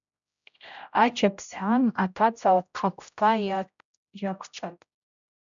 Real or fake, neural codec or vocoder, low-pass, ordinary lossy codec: fake; codec, 16 kHz, 0.5 kbps, X-Codec, HuBERT features, trained on general audio; 7.2 kHz; MP3, 96 kbps